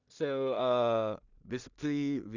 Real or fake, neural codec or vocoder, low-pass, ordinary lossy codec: fake; codec, 16 kHz in and 24 kHz out, 0.4 kbps, LongCat-Audio-Codec, two codebook decoder; 7.2 kHz; none